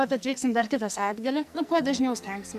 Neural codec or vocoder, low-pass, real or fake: codec, 44.1 kHz, 2.6 kbps, SNAC; 14.4 kHz; fake